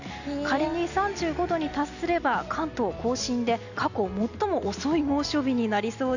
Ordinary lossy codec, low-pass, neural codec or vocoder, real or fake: none; 7.2 kHz; none; real